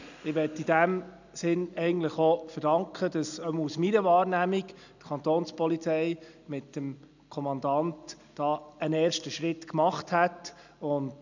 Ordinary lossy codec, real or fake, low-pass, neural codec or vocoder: none; real; 7.2 kHz; none